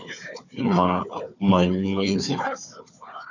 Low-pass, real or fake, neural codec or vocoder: 7.2 kHz; fake; codec, 16 kHz, 4 kbps, FunCodec, trained on Chinese and English, 50 frames a second